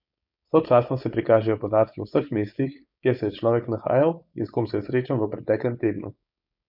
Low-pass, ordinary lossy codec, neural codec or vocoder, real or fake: 5.4 kHz; none; codec, 16 kHz, 4.8 kbps, FACodec; fake